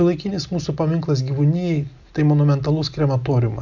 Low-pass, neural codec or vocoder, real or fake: 7.2 kHz; none; real